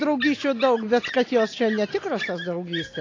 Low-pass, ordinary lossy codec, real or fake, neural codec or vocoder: 7.2 kHz; AAC, 32 kbps; real; none